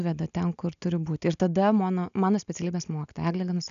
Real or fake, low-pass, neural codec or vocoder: real; 7.2 kHz; none